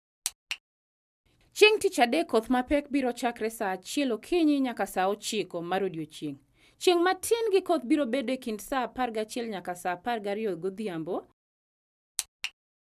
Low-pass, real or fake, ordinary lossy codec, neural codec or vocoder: 14.4 kHz; real; none; none